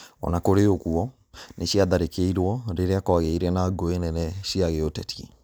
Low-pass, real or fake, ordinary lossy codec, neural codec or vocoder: none; real; none; none